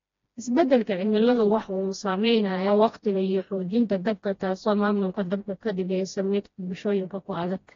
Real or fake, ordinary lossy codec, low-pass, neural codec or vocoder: fake; AAC, 32 kbps; 7.2 kHz; codec, 16 kHz, 1 kbps, FreqCodec, smaller model